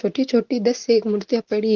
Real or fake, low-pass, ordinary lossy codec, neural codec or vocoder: real; 7.2 kHz; Opus, 16 kbps; none